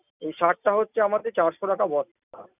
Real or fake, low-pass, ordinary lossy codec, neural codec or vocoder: real; 3.6 kHz; none; none